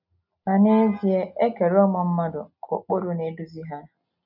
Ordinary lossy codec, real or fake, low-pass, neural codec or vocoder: none; real; 5.4 kHz; none